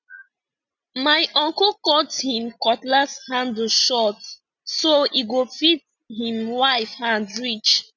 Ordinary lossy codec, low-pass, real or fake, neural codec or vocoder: none; 7.2 kHz; real; none